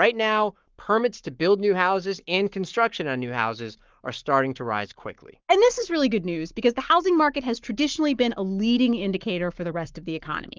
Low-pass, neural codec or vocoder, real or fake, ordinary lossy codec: 7.2 kHz; codec, 44.1 kHz, 7.8 kbps, Pupu-Codec; fake; Opus, 32 kbps